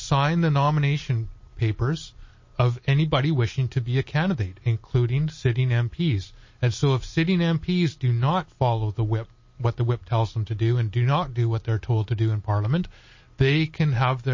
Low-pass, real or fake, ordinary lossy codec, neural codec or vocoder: 7.2 kHz; real; MP3, 32 kbps; none